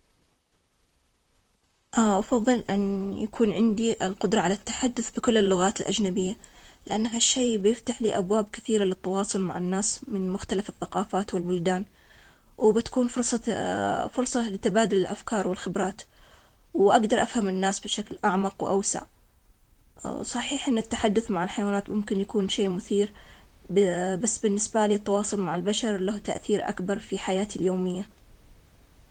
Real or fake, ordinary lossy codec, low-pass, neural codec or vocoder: fake; Opus, 24 kbps; 19.8 kHz; vocoder, 44.1 kHz, 128 mel bands, Pupu-Vocoder